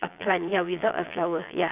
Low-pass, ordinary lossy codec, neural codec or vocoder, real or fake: 3.6 kHz; none; vocoder, 22.05 kHz, 80 mel bands, Vocos; fake